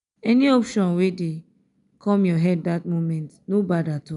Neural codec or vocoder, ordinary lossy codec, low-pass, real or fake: none; none; 10.8 kHz; real